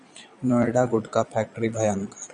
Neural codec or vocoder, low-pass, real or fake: vocoder, 22.05 kHz, 80 mel bands, Vocos; 9.9 kHz; fake